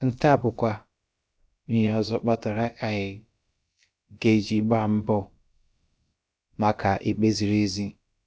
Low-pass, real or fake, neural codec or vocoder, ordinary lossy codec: none; fake; codec, 16 kHz, about 1 kbps, DyCAST, with the encoder's durations; none